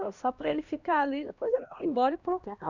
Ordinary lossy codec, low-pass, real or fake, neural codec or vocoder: Opus, 64 kbps; 7.2 kHz; fake; codec, 16 kHz, 1 kbps, X-Codec, HuBERT features, trained on LibriSpeech